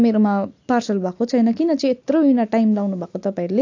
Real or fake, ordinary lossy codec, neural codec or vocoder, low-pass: real; AAC, 48 kbps; none; 7.2 kHz